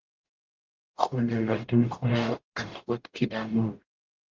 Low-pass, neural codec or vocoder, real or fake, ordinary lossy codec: 7.2 kHz; codec, 44.1 kHz, 0.9 kbps, DAC; fake; Opus, 32 kbps